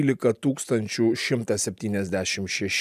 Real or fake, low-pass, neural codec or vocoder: real; 14.4 kHz; none